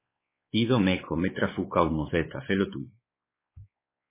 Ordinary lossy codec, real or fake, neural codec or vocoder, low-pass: MP3, 16 kbps; fake; codec, 16 kHz, 4 kbps, X-Codec, WavLM features, trained on Multilingual LibriSpeech; 3.6 kHz